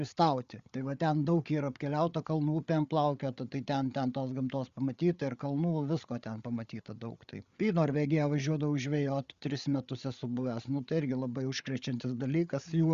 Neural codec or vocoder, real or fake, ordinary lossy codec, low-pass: codec, 16 kHz, 8 kbps, FreqCodec, larger model; fake; Opus, 64 kbps; 7.2 kHz